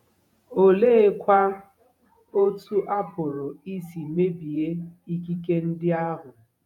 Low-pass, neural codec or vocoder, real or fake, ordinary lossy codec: 19.8 kHz; vocoder, 48 kHz, 128 mel bands, Vocos; fake; none